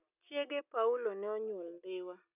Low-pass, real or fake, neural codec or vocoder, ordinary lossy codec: 3.6 kHz; real; none; none